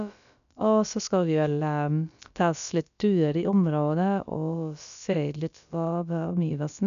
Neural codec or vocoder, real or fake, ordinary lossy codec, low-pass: codec, 16 kHz, about 1 kbps, DyCAST, with the encoder's durations; fake; none; 7.2 kHz